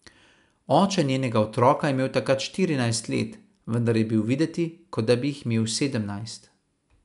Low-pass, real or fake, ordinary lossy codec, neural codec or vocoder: 10.8 kHz; real; none; none